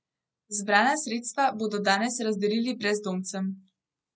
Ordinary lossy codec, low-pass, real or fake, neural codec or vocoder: none; none; real; none